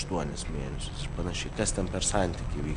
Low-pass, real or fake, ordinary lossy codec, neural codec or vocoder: 9.9 kHz; real; AAC, 64 kbps; none